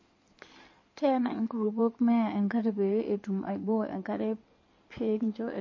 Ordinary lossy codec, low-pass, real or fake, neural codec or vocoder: MP3, 32 kbps; 7.2 kHz; fake; codec, 16 kHz in and 24 kHz out, 2.2 kbps, FireRedTTS-2 codec